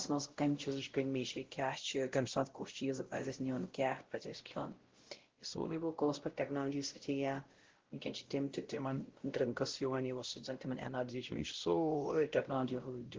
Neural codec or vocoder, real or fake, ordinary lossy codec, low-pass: codec, 16 kHz, 0.5 kbps, X-Codec, WavLM features, trained on Multilingual LibriSpeech; fake; Opus, 16 kbps; 7.2 kHz